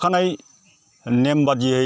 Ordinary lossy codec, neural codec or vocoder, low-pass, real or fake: none; none; none; real